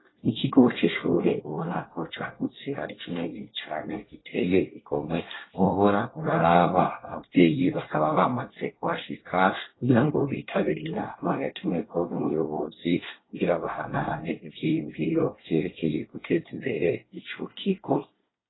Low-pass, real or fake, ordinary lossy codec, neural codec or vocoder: 7.2 kHz; fake; AAC, 16 kbps; codec, 24 kHz, 1 kbps, SNAC